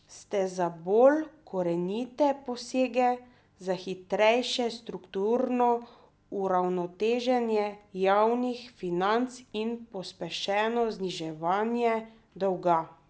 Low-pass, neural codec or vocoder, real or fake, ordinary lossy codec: none; none; real; none